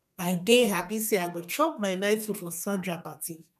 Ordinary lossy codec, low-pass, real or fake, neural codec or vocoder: none; 14.4 kHz; fake; codec, 32 kHz, 1.9 kbps, SNAC